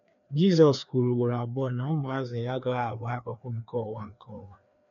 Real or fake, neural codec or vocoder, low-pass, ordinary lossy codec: fake; codec, 16 kHz, 2 kbps, FreqCodec, larger model; 7.2 kHz; none